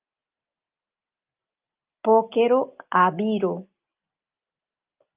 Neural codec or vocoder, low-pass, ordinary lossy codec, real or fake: none; 3.6 kHz; Opus, 24 kbps; real